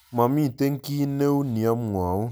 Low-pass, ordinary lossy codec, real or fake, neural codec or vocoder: none; none; real; none